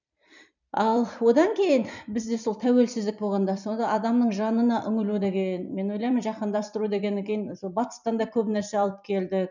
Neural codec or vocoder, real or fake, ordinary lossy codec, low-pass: vocoder, 44.1 kHz, 128 mel bands every 256 samples, BigVGAN v2; fake; none; 7.2 kHz